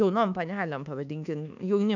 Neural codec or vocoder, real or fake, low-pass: codec, 24 kHz, 1.2 kbps, DualCodec; fake; 7.2 kHz